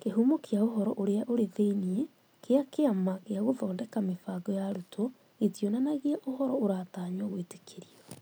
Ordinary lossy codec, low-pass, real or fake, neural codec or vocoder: none; none; real; none